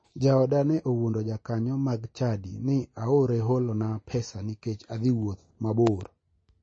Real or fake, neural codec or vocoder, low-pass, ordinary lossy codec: real; none; 9.9 kHz; MP3, 32 kbps